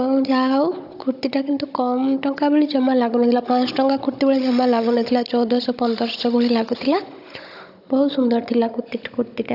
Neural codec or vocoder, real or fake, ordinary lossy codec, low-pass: codec, 16 kHz, 16 kbps, FunCodec, trained on Chinese and English, 50 frames a second; fake; AAC, 48 kbps; 5.4 kHz